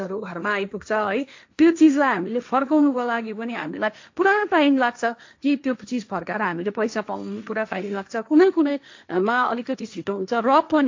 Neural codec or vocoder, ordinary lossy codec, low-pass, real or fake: codec, 16 kHz, 1.1 kbps, Voila-Tokenizer; AAC, 48 kbps; 7.2 kHz; fake